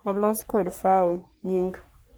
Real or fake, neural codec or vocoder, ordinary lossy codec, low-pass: fake; codec, 44.1 kHz, 3.4 kbps, Pupu-Codec; none; none